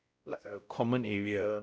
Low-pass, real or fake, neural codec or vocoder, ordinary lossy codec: none; fake; codec, 16 kHz, 0.5 kbps, X-Codec, WavLM features, trained on Multilingual LibriSpeech; none